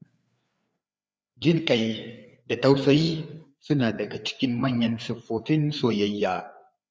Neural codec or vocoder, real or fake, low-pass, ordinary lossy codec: codec, 16 kHz, 4 kbps, FreqCodec, larger model; fake; none; none